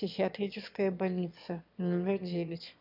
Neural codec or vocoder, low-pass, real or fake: autoencoder, 22.05 kHz, a latent of 192 numbers a frame, VITS, trained on one speaker; 5.4 kHz; fake